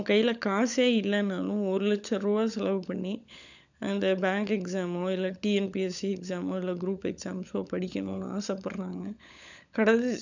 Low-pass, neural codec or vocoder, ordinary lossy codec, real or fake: 7.2 kHz; codec, 16 kHz, 16 kbps, FunCodec, trained on LibriTTS, 50 frames a second; none; fake